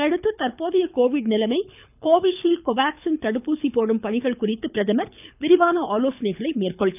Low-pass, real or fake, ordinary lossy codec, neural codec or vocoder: 3.6 kHz; fake; none; codec, 24 kHz, 6 kbps, HILCodec